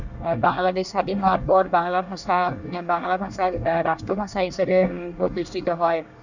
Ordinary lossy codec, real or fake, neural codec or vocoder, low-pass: none; fake; codec, 24 kHz, 1 kbps, SNAC; 7.2 kHz